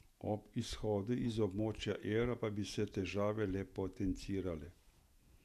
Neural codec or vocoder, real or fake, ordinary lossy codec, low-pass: vocoder, 48 kHz, 128 mel bands, Vocos; fake; none; 14.4 kHz